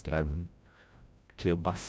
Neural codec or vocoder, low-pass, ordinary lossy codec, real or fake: codec, 16 kHz, 0.5 kbps, FreqCodec, larger model; none; none; fake